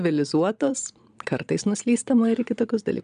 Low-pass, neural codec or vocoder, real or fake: 9.9 kHz; vocoder, 22.05 kHz, 80 mel bands, Vocos; fake